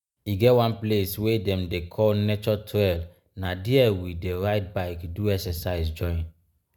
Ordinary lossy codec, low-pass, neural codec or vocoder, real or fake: none; none; none; real